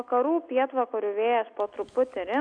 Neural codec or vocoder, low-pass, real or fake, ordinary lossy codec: none; 9.9 kHz; real; AAC, 64 kbps